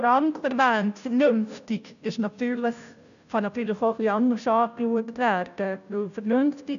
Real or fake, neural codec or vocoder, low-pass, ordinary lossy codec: fake; codec, 16 kHz, 0.5 kbps, FunCodec, trained on Chinese and English, 25 frames a second; 7.2 kHz; none